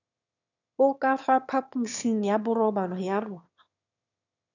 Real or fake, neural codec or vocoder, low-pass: fake; autoencoder, 22.05 kHz, a latent of 192 numbers a frame, VITS, trained on one speaker; 7.2 kHz